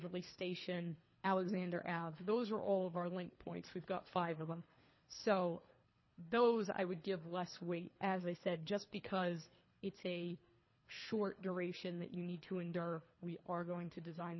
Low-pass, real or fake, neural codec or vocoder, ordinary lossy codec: 7.2 kHz; fake; codec, 24 kHz, 3 kbps, HILCodec; MP3, 24 kbps